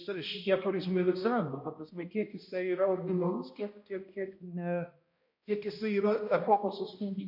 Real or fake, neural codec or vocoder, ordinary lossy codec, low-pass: fake; codec, 16 kHz, 1 kbps, X-Codec, HuBERT features, trained on balanced general audio; MP3, 32 kbps; 5.4 kHz